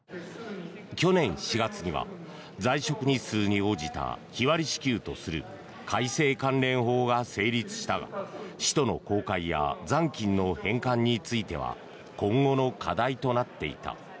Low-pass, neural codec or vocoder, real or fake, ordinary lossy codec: none; none; real; none